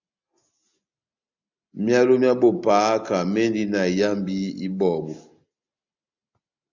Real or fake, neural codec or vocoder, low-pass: real; none; 7.2 kHz